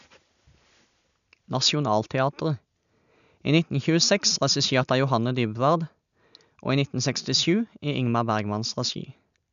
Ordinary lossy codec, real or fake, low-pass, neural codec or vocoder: none; real; 7.2 kHz; none